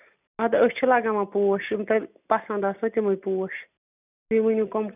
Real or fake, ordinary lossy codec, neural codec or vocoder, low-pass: real; none; none; 3.6 kHz